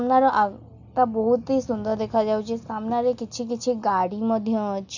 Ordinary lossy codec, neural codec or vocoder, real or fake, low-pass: none; none; real; 7.2 kHz